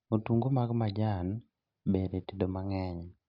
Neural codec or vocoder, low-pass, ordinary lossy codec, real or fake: none; 5.4 kHz; none; real